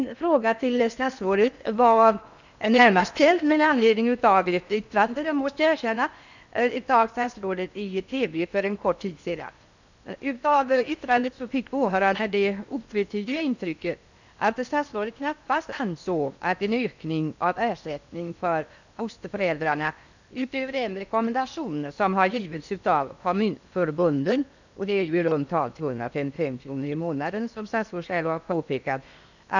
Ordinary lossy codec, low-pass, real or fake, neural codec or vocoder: none; 7.2 kHz; fake; codec, 16 kHz in and 24 kHz out, 0.8 kbps, FocalCodec, streaming, 65536 codes